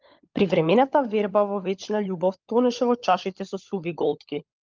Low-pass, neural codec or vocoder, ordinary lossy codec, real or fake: 7.2 kHz; codec, 16 kHz, 16 kbps, FunCodec, trained on LibriTTS, 50 frames a second; Opus, 32 kbps; fake